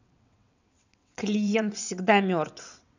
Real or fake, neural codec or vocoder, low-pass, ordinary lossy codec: fake; vocoder, 22.05 kHz, 80 mel bands, WaveNeXt; 7.2 kHz; none